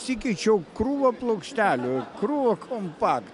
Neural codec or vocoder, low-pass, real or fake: none; 10.8 kHz; real